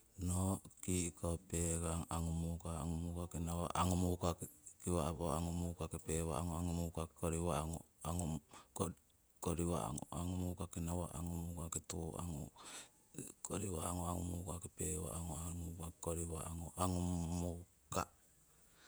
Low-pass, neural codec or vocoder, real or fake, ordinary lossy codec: none; vocoder, 48 kHz, 128 mel bands, Vocos; fake; none